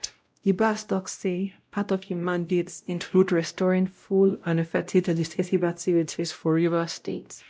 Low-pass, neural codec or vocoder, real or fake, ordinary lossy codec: none; codec, 16 kHz, 0.5 kbps, X-Codec, WavLM features, trained on Multilingual LibriSpeech; fake; none